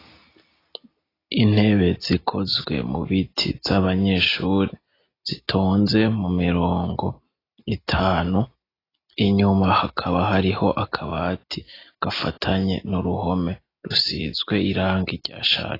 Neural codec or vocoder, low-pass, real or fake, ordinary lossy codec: none; 5.4 kHz; real; AAC, 24 kbps